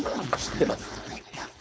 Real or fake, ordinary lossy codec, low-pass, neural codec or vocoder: fake; none; none; codec, 16 kHz, 4.8 kbps, FACodec